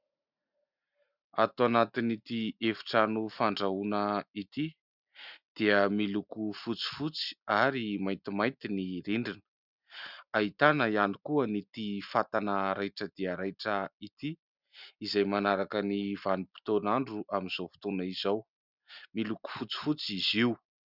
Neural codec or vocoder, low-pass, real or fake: none; 5.4 kHz; real